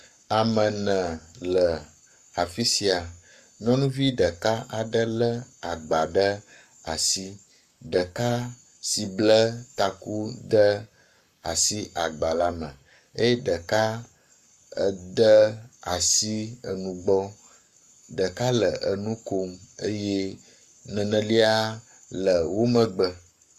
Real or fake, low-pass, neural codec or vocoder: fake; 14.4 kHz; codec, 44.1 kHz, 7.8 kbps, Pupu-Codec